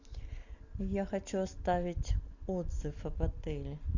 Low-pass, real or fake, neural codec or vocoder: 7.2 kHz; real; none